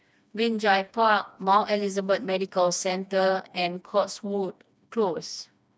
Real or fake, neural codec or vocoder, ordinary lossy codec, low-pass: fake; codec, 16 kHz, 2 kbps, FreqCodec, smaller model; none; none